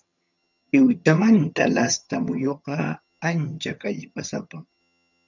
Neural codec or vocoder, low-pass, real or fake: vocoder, 22.05 kHz, 80 mel bands, HiFi-GAN; 7.2 kHz; fake